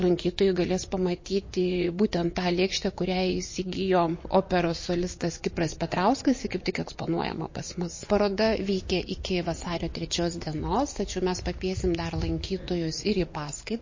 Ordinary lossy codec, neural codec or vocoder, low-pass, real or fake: MP3, 32 kbps; vocoder, 24 kHz, 100 mel bands, Vocos; 7.2 kHz; fake